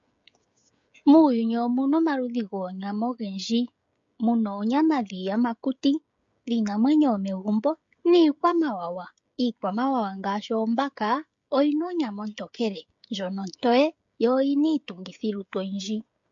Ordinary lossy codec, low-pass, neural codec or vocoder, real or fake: MP3, 48 kbps; 7.2 kHz; codec, 16 kHz, 16 kbps, FreqCodec, smaller model; fake